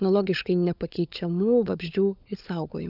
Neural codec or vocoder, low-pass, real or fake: codec, 16 kHz, 16 kbps, FunCodec, trained on LibriTTS, 50 frames a second; 5.4 kHz; fake